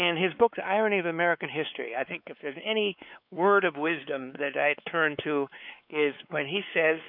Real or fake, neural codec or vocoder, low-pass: fake; codec, 16 kHz, 4 kbps, X-Codec, WavLM features, trained on Multilingual LibriSpeech; 5.4 kHz